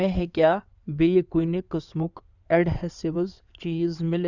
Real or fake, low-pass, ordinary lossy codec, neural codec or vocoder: fake; 7.2 kHz; none; codec, 16 kHz in and 24 kHz out, 2.2 kbps, FireRedTTS-2 codec